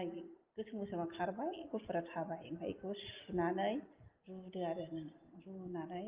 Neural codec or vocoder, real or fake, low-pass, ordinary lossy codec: none; real; 3.6 kHz; Opus, 32 kbps